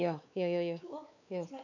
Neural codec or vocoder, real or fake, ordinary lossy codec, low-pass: codec, 16 kHz, 4 kbps, X-Codec, WavLM features, trained on Multilingual LibriSpeech; fake; none; 7.2 kHz